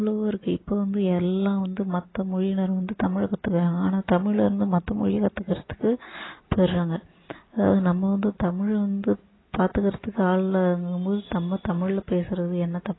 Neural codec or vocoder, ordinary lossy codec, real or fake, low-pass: none; AAC, 16 kbps; real; 7.2 kHz